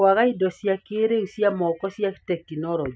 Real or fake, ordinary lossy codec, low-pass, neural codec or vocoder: real; none; none; none